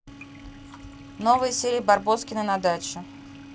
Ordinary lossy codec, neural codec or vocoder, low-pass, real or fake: none; none; none; real